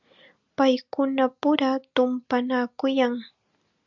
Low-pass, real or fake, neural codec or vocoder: 7.2 kHz; real; none